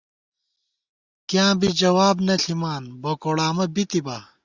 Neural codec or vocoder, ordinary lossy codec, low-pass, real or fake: none; Opus, 64 kbps; 7.2 kHz; real